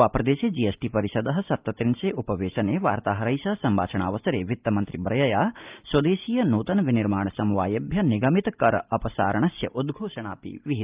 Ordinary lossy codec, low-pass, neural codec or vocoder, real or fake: Opus, 24 kbps; 3.6 kHz; none; real